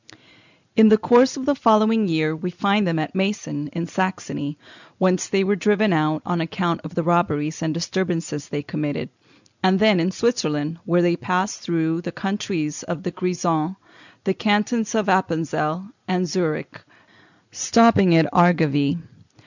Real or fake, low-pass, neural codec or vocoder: real; 7.2 kHz; none